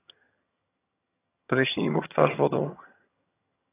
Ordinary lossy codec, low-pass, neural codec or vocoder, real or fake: AAC, 24 kbps; 3.6 kHz; vocoder, 22.05 kHz, 80 mel bands, HiFi-GAN; fake